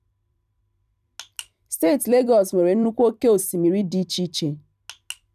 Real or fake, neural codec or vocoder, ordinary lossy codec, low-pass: fake; vocoder, 44.1 kHz, 128 mel bands every 256 samples, BigVGAN v2; none; 14.4 kHz